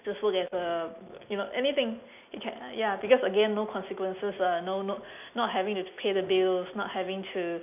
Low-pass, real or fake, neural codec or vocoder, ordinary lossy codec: 3.6 kHz; real; none; none